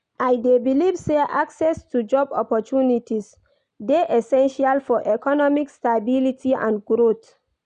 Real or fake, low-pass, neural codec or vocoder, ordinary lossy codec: real; 9.9 kHz; none; none